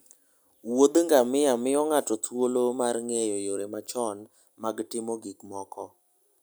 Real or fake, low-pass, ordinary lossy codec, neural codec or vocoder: real; none; none; none